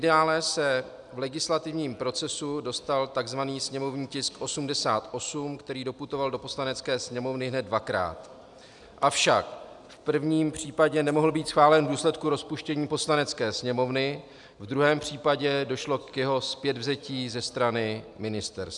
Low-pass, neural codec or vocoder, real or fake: 10.8 kHz; none; real